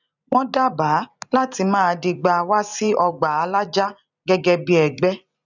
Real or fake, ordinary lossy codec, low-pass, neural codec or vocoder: fake; none; 7.2 kHz; vocoder, 44.1 kHz, 128 mel bands every 256 samples, BigVGAN v2